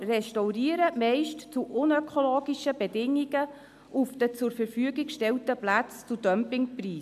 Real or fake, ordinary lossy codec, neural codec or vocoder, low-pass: real; AAC, 96 kbps; none; 14.4 kHz